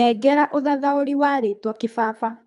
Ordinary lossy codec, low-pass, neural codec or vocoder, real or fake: MP3, 96 kbps; 10.8 kHz; codec, 24 kHz, 3 kbps, HILCodec; fake